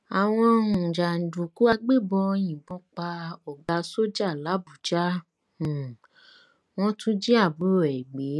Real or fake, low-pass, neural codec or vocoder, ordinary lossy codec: real; none; none; none